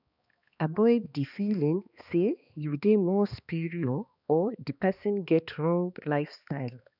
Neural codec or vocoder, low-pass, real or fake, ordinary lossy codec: codec, 16 kHz, 2 kbps, X-Codec, HuBERT features, trained on balanced general audio; 5.4 kHz; fake; none